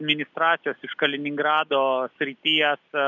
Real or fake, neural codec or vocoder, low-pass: real; none; 7.2 kHz